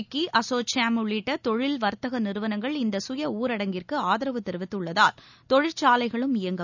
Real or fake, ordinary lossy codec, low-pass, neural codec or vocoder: real; none; 7.2 kHz; none